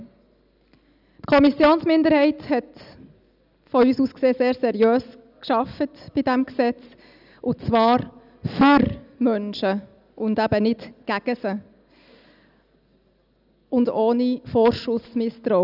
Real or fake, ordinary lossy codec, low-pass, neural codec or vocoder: real; none; 5.4 kHz; none